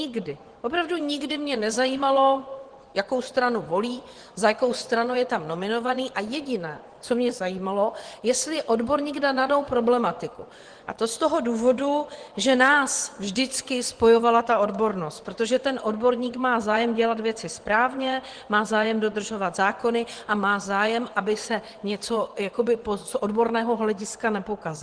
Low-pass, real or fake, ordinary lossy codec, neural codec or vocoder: 9.9 kHz; fake; Opus, 16 kbps; vocoder, 24 kHz, 100 mel bands, Vocos